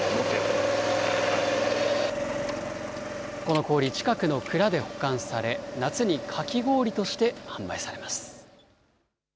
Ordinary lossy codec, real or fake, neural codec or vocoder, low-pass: none; real; none; none